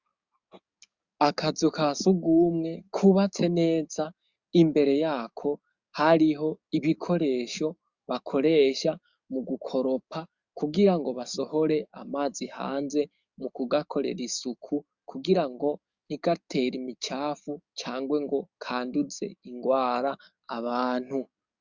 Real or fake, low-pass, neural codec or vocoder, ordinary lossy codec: fake; 7.2 kHz; codec, 44.1 kHz, 7.8 kbps, DAC; Opus, 64 kbps